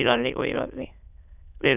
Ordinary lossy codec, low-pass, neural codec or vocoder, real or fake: none; 3.6 kHz; autoencoder, 22.05 kHz, a latent of 192 numbers a frame, VITS, trained on many speakers; fake